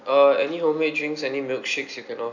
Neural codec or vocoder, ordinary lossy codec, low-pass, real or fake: none; none; 7.2 kHz; real